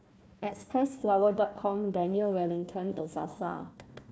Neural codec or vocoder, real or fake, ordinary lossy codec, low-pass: codec, 16 kHz, 1 kbps, FunCodec, trained on Chinese and English, 50 frames a second; fake; none; none